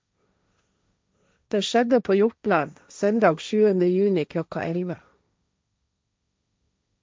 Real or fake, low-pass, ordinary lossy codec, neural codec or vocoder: fake; none; none; codec, 16 kHz, 1.1 kbps, Voila-Tokenizer